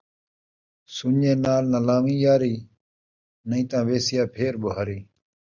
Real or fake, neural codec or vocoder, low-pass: real; none; 7.2 kHz